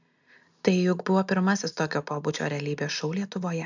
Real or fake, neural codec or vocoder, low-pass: real; none; 7.2 kHz